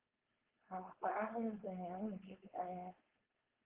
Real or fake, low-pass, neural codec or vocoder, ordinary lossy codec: fake; 3.6 kHz; codec, 16 kHz, 4.8 kbps, FACodec; Opus, 16 kbps